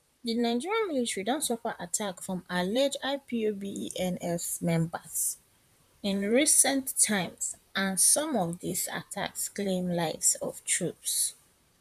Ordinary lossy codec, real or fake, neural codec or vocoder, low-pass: none; fake; vocoder, 44.1 kHz, 128 mel bands, Pupu-Vocoder; 14.4 kHz